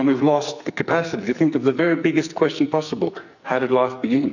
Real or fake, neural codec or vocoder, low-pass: fake; codec, 44.1 kHz, 2.6 kbps, SNAC; 7.2 kHz